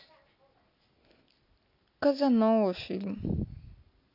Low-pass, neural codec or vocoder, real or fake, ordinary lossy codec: 5.4 kHz; none; real; AAC, 48 kbps